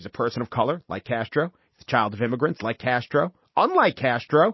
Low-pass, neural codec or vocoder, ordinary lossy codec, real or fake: 7.2 kHz; none; MP3, 24 kbps; real